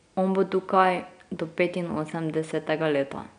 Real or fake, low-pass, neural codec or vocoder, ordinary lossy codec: real; 9.9 kHz; none; none